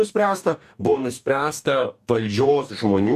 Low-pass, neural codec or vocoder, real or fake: 14.4 kHz; codec, 44.1 kHz, 2.6 kbps, DAC; fake